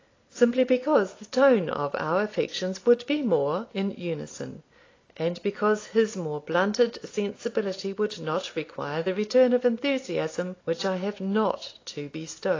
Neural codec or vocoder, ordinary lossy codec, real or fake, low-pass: none; AAC, 32 kbps; real; 7.2 kHz